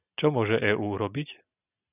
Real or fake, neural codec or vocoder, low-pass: real; none; 3.6 kHz